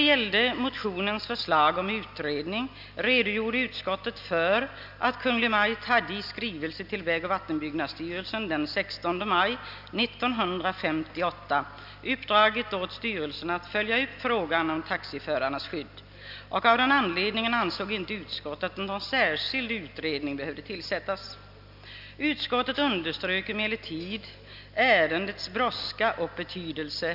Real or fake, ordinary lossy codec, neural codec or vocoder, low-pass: real; none; none; 5.4 kHz